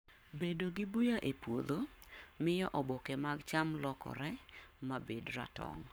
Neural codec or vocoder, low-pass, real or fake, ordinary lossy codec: codec, 44.1 kHz, 7.8 kbps, Pupu-Codec; none; fake; none